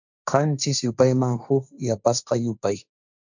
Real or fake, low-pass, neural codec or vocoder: fake; 7.2 kHz; codec, 16 kHz, 1.1 kbps, Voila-Tokenizer